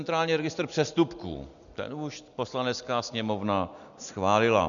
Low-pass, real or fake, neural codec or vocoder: 7.2 kHz; real; none